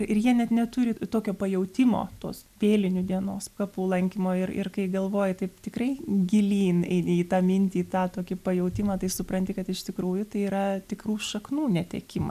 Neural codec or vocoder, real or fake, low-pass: none; real; 14.4 kHz